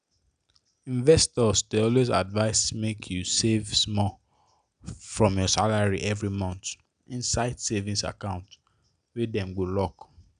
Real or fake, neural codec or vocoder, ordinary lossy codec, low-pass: real; none; none; 9.9 kHz